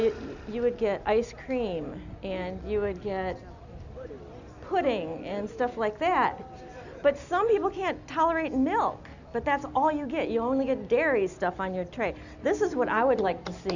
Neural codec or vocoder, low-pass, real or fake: none; 7.2 kHz; real